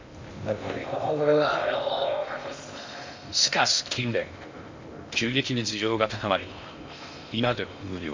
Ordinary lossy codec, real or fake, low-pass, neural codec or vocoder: MP3, 64 kbps; fake; 7.2 kHz; codec, 16 kHz in and 24 kHz out, 0.6 kbps, FocalCodec, streaming, 2048 codes